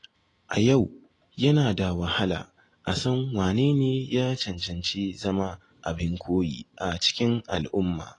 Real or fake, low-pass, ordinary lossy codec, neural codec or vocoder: real; 10.8 kHz; AAC, 32 kbps; none